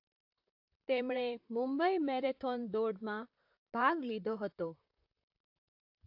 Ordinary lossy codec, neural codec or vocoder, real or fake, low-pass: AAC, 48 kbps; vocoder, 44.1 kHz, 128 mel bands, Pupu-Vocoder; fake; 5.4 kHz